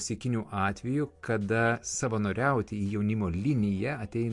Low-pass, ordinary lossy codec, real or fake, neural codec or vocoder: 10.8 kHz; MP3, 64 kbps; real; none